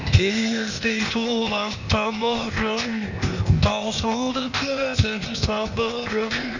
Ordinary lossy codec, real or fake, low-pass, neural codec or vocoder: none; fake; 7.2 kHz; codec, 16 kHz, 0.8 kbps, ZipCodec